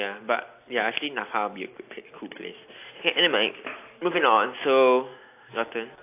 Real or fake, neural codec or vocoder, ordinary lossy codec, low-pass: real; none; AAC, 24 kbps; 3.6 kHz